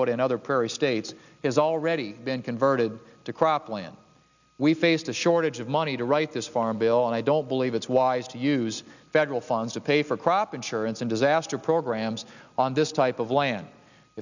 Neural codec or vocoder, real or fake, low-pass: none; real; 7.2 kHz